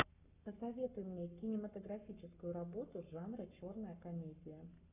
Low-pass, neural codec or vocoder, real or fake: 3.6 kHz; codec, 44.1 kHz, 7.8 kbps, Pupu-Codec; fake